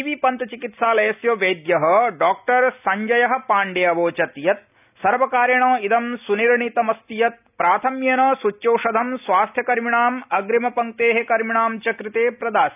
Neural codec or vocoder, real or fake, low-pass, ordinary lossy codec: none; real; 3.6 kHz; none